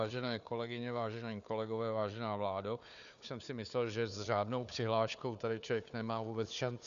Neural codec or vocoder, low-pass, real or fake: codec, 16 kHz, 4 kbps, FunCodec, trained on Chinese and English, 50 frames a second; 7.2 kHz; fake